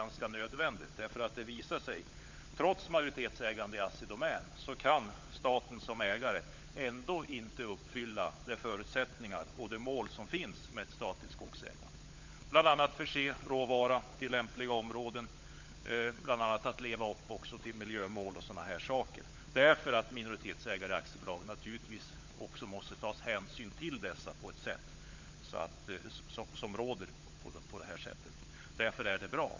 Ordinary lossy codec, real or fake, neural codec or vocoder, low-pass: MP3, 48 kbps; fake; codec, 16 kHz, 16 kbps, FunCodec, trained on LibriTTS, 50 frames a second; 7.2 kHz